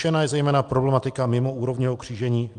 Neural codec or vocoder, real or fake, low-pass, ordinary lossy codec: none; real; 10.8 kHz; Opus, 32 kbps